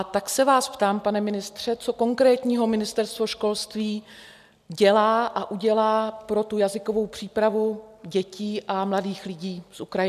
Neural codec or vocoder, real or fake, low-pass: none; real; 14.4 kHz